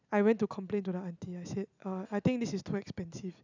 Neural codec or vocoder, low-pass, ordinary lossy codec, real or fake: none; 7.2 kHz; none; real